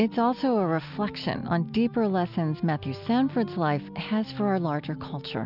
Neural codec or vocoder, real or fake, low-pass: none; real; 5.4 kHz